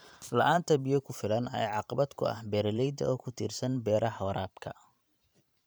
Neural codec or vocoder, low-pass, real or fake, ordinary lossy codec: vocoder, 44.1 kHz, 128 mel bands every 512 samples, BigVGAN v2; none; fake; none